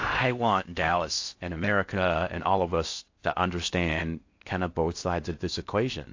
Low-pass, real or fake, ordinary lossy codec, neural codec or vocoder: 7.2 kHz; fake; AAC, 48 kbps; codec, 16 kHz in and 24 kHz out, 0.6 kbps, FocalCodec, streaming, 4096 codes